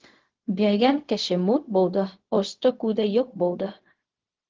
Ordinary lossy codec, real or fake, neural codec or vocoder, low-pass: Opus, 16 kbps; fake; codec, 16 kHz, 0.4 kbps, LongCat-Audio-Codec; 7.2 kHz